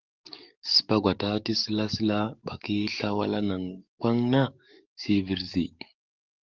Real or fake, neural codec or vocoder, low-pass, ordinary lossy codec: fake; codec, 44.1 kHz, 7.8 kbps, DAC; 7.2 kHz; Opus, 24 kbps